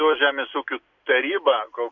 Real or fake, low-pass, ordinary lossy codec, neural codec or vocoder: real; 7.2 kHz; MP3, 64 kbps; none